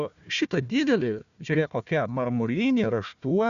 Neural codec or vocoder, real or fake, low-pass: codec, 16 kHz, 1 kbps, FunCodec, trained on Chinese and English, 50 frames a second; fake; 7.2 kHz